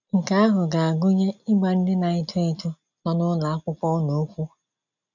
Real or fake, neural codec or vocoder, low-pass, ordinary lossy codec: real; none; 7.2 kHz; none